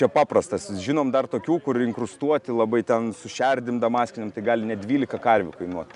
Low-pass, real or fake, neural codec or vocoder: 10.8 kHz; real; none